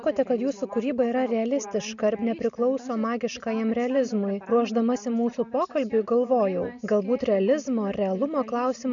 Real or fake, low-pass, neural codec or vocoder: real; 7.2 kHz; none